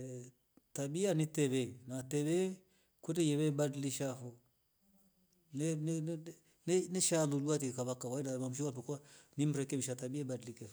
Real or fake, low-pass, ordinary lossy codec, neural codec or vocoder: real; none; none; none